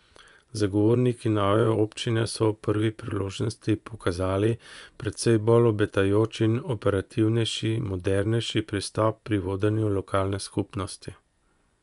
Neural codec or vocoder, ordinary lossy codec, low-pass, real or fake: vocoder, 24 kHz, 100 mel bands, Vocos; none; 10.8 kHz; fake